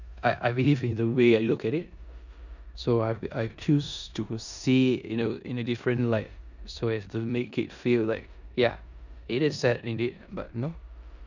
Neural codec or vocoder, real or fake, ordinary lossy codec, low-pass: codec, 16 kHz in and 24 kHz out, 0.9 kbps, LongCat-Audio-Codec, four codebook decoder; fake; none; 7.2 kHz